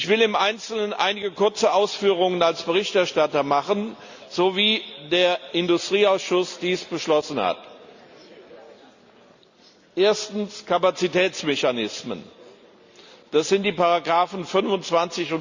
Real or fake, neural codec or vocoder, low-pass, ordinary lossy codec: real; none; 7.2 kHz; Opus, 64 kbps